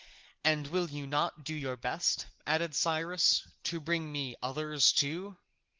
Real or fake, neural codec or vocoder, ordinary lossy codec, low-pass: real; none; Opus, 16 kbps; 7.2 kHz